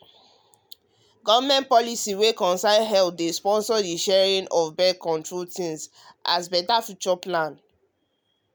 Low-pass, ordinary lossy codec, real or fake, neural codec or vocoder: none; none; real; none